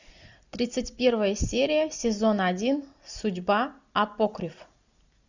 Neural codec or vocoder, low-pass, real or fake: none; 7.2 kHz; real